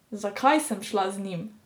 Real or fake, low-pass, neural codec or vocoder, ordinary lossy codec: real; none; none; none